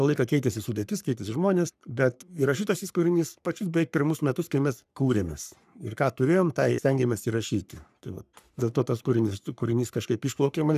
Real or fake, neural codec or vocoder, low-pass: fake; codec, 44.1 kHz, 3.4 kbps, Pupu-Codec; 14.4 kHz